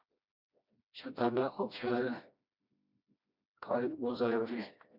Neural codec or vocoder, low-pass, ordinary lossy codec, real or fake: codec, 16 kHz, 1 kbps, FreqCodec, smaller model; 5.4 kHz; MP3, 48 kbps; fake